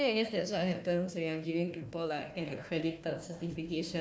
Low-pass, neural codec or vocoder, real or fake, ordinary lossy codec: none; codec, 16 kHz, 1 kbps, FunCodec, trained on Chinese and English, 50 frames a second; fake; none